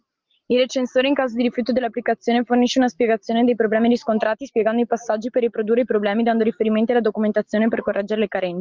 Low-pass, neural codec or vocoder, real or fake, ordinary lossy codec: 7.2 kHz; none; real; Opus, 32 kbps